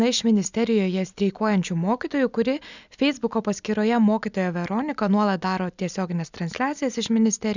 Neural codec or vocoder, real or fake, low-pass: none; real; 7.2 kHz